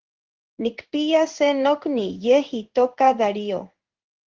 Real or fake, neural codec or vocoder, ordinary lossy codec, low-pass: fake; codec, 16 kHz in and 24 kHz out, 1 kbps, XY-Tokenizer; Opus, 16 kbps; 7.2 kHz